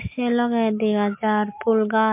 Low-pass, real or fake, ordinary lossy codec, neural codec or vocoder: 3.6 kHz; real; MP3, 24 kbps; none